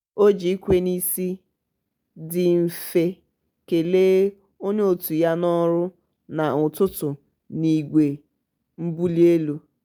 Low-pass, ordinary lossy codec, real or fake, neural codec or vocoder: none; none; real; none